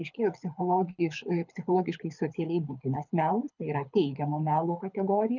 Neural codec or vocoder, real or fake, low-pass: codec, 24 kHz, 6 kbps, HILCodec; fake; 7.2 kHz